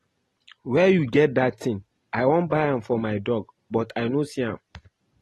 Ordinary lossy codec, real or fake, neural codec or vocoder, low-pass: AAC, 32 kbps; real; none; 19.8 kHz